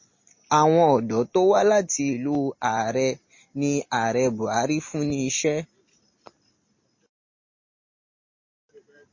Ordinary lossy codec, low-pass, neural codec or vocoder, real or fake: MP3, 32 kbps; 7.2 kHz; none; real